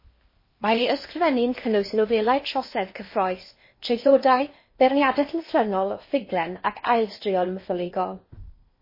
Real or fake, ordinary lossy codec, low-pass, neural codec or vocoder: fake; MP3, 24 kbps; 5.4 kHz; codec, 16 kHz in and 24 kHz out, 0.8 kbps, FocalCodec, streaming, 65536 codes